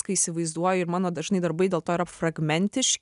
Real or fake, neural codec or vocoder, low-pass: real; none; 10.8 kHz